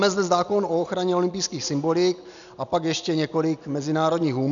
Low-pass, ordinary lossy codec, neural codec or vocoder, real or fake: 7.2 kHz; MP3, 96 kbps; none; real